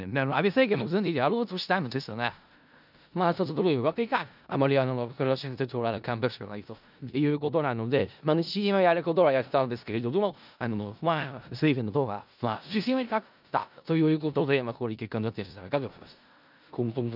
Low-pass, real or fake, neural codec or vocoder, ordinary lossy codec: 5.4 kHz; fake; codec, 16 kHz in and 24 kHz out, 0.4 kbps, LongCat-Audio-Codec, four codebook decoder; none